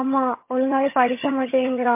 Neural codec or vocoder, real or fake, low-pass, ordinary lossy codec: vocoder, 22.05 kHz, 80 mel bands, HiFi-GAN; fake; 3.6 kHz; MP3, 24 kbps